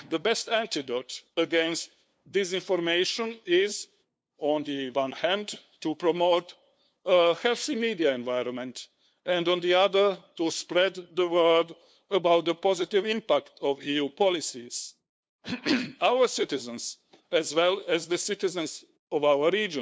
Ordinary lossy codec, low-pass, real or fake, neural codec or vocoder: none; none; fake; codec, 16 kHz, 2 kbps, FunCodec, trained on LibriTTS, 25 frames a second